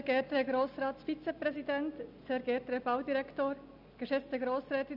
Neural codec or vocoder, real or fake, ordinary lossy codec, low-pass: none; real; none; 5.4 kHz